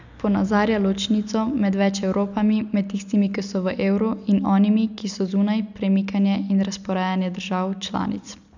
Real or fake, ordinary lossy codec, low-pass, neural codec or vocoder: real; none; 7.2 kHz; none